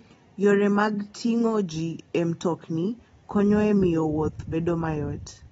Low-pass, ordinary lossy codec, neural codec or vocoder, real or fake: 19.8 kHz; AAC, 24 kbps; none; real